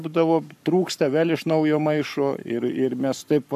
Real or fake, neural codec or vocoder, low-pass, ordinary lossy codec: fake; codec, 44.1 kHz, 7.8 kbps, Pupu-Codec; 14.4 kHz; AAC, 96 kbps